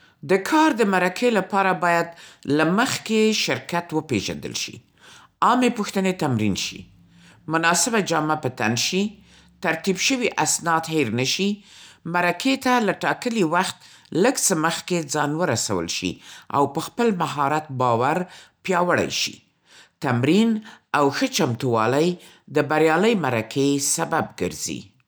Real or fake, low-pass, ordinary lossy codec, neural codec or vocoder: real; none; none; none